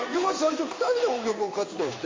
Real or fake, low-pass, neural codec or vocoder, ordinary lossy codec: fake; 7.2 kHz; codec, 16 kHz in and 24 kHz out, 1 kbps, XY-Tokenizer; MP3, 32 kbps